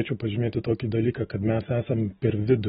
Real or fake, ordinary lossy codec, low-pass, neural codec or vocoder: real; AAC, 16 kbps; 10.8 kHz; none